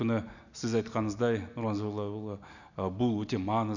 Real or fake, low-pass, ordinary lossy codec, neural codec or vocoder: real; 7.2 kHz; none; none